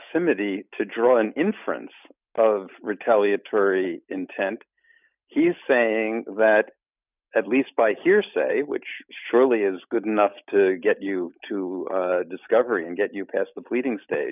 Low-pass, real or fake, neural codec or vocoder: 3.6 kHz; real; none